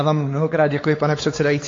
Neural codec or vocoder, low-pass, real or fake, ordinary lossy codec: codec, 16 kHz, 4 kbps, X-Codec, WavLM features, trained on Multilingual LibriSpeech; 7.2 kHz; fake; AAC, 32 kbps